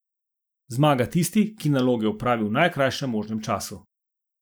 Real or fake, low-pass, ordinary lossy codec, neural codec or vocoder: real; none; none; none